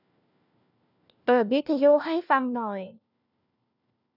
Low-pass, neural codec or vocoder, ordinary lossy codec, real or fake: 5.4 kHz; codec, 16 kHz, 1 kbps, FunCodec, trained on LibriTTS, 50 frames a second; none; fake